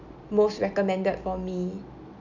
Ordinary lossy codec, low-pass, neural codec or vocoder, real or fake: none; 7.2 kHz; none; real